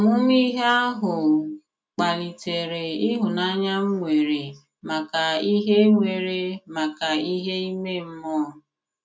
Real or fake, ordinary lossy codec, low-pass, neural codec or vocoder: real; none; none; none